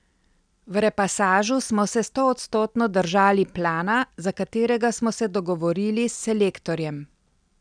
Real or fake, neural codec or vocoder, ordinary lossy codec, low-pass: real; none; none; 9.9 kHz